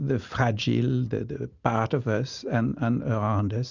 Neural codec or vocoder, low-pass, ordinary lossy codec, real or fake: none; 7.2 kHz; Opus, 64 kbps; real